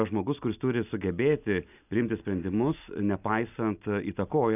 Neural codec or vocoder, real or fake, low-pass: none; real; 3.6 kHz